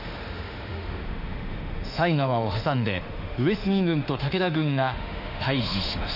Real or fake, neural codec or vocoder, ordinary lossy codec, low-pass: fake; autoencoder, 48 kHz, 32 numbers a frame, DAC-VAE, trained on Japanese speech; none; 5.4 kHz